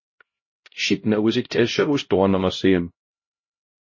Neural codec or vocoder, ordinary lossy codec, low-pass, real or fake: codec, 16 kHz, 0.5 kbps, X-Codec, HuBERT features, trained on LibriSpeech; MP3, 32 kbps; 7.2 kHz; fake